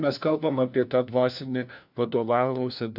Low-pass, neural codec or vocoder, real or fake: 5.4 kHz; codec, 16 kHz, 1 kbps, FunCodec, trained on LibriTTS, 50 frames a second; fake